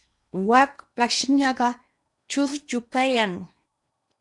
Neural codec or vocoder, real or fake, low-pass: codec, 16 kHz in and 24 kHz out, 0.8 kbps, FocalCodec, streaming, 65536 codes; fake; 10.8 kHz